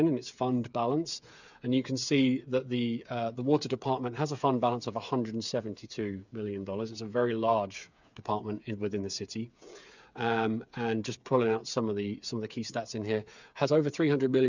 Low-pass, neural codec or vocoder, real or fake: 7.2 kHz; codec, 16 kHz, 8 kbps, FreqCodec, smaller model; fake